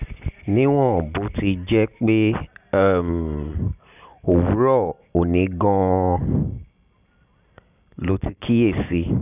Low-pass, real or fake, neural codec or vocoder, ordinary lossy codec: 3.6 kHz; real; none; none